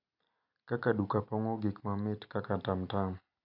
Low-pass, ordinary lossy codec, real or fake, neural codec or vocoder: 5.4 kHz; none; real; none